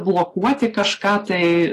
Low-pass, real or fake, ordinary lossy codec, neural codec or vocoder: 14.4 kHz; real; AAC, 48 kbps; none